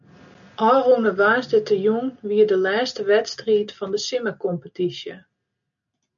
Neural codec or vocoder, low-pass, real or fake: none; 7.2 kHz; real